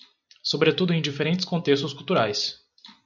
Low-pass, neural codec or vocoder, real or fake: 7.2 kHz; none; real